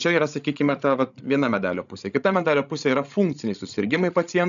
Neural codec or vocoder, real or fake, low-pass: codec, 16 kHz, 16 kbps, FreqCodec, larger model; fake; 7.2 kHz